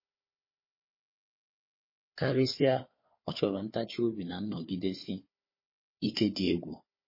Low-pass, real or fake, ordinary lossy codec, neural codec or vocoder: 5.4 kHz; fake; MP3, 24 kbps; codec, 16 kHz, 4 kbps, FunCodec, trained on Chinese and English, 50 frames a second